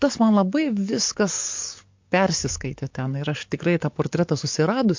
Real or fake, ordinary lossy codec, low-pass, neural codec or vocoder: fake; MP3, 48 kbps; 7.2 kHz; codec, 44.1 kHz, 7.8 kbps, DAC